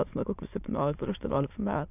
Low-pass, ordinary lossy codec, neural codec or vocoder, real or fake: 3.6 kHz; AAC, 32 kbps; autoencoder, 22.05 kHz, a latent of 192 numbers a frame, VITS, trained on many speakers; fake